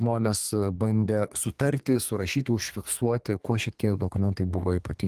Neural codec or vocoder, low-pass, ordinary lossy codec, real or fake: codec, 32 kHz, 1.9 kbps, SNAC; 14.4 kHz; Opus, 24 kbps; fake